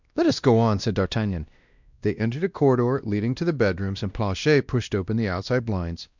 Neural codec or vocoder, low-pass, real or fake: codec, 16 kHz, 1 kbps, X-Codec, WavLM features, trained on Multilingual LibriSpeech; 7.2 kHz; fake